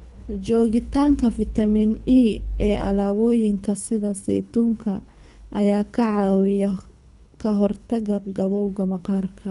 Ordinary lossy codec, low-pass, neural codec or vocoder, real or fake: none; 10.8 kHz; codec, 24 kHz, 3 kbps, HILCodec; fake